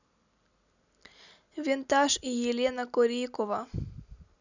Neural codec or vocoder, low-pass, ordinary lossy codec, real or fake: none; 7.2 kHz; none; real